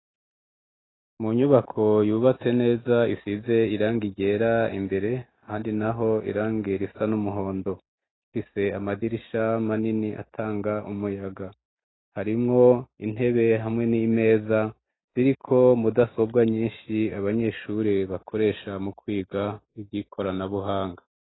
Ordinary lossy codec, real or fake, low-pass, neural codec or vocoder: AAC, 16 kbps; real; 7.2 kHz; none